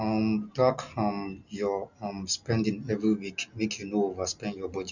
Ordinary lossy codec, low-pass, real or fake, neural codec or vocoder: none; 7.2 kHz; real; none